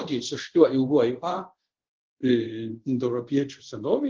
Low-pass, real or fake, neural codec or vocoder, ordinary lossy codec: 7.2 kHz; fake; codec, 24 kHz, 0.5 kbps, DualCodec; Opus, 16 kbps